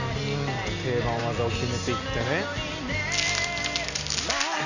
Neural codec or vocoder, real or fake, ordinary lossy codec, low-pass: none; real; none; 7.2 kHz